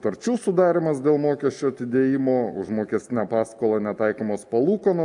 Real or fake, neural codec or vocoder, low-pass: real; none; 10.8 kHz